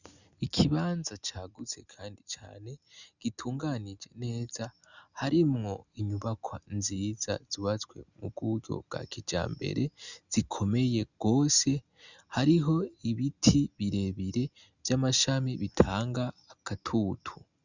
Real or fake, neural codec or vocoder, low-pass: real; none; 7.2 kHz